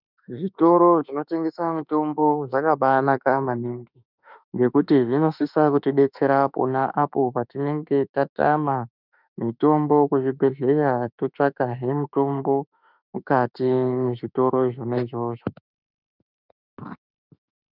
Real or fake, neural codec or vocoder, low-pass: fake; autoencoder, 48 kHz, 32 numbers a frame, DAC-VAE, trained on Japanese speech; 5.4 kHz